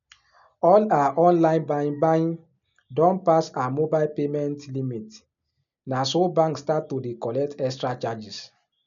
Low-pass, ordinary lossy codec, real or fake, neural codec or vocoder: 7.2 kHz; none; real; none